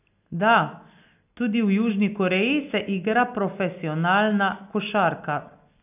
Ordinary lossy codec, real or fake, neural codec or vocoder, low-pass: none; real; none; 3.6 kHz